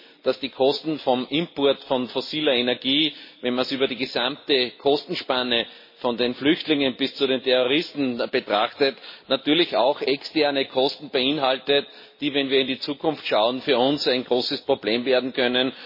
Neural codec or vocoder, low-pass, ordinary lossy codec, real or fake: none; 5.4 kHz; MP3, 24 kbps; real